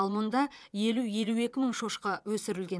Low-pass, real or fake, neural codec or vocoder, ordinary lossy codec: none; fake; vocoder, 22.05 kHz, 80 mel bands, Vocos; none